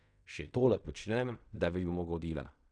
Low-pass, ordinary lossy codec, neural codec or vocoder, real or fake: 9.9 kHz; none; codec, 16 kHz in and 24 kHz out, 0.4 kbps, LongCat-Audio-Codec, fine tuned four codebook decoder; fake